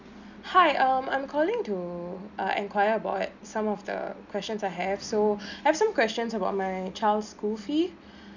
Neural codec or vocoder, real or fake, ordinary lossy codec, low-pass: none; real; none; 7.2 kHz